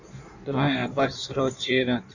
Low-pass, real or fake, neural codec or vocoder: 7.2 kHz; fake; codec, 16 kHz in and 24 kHz out, 1.1 kbps, FireRedTTS-2 codec